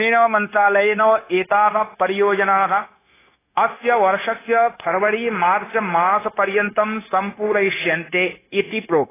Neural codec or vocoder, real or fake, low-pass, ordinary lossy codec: codec, 16 kHz, 0.9 kbps, LongCat-Audio-Codec; fake; 3.6 kHz; AAC, 16 kbps